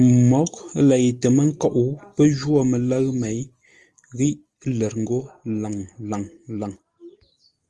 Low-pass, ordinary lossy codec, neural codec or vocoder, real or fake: 10.8 kHz; Opus, 24 kbps; none; real